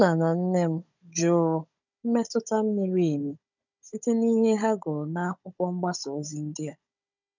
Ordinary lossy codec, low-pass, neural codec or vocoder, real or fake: none; 7.2 kHz; codec, 16 kHz, 16 kbps, FunCodec, trained on Chinese and English, 50 frames a second; fake